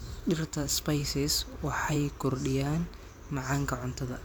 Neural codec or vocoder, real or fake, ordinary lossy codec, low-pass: vocoder, 44.1 kHz, 128 mel bands every 512 samples, BigVGAN v2; fake; none; none